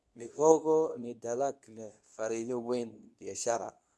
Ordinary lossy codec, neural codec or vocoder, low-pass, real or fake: none; codec, 24 kHz, 0.9 kbps, WavTokenizer, medium speech release version 2; none; fake